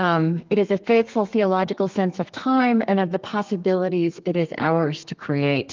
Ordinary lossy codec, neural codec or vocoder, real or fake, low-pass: Opus, 24 kbps; codec, 32 kHz, 1.9 kbps, SNAC; fake; 7.2 kHz